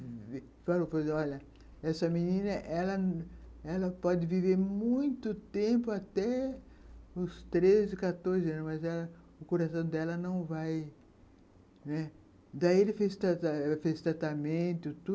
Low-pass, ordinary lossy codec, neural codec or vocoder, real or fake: none; none; none; real